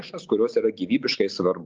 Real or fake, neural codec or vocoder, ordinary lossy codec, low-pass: real; none; Opus, 24 kbps; 7.2 kHz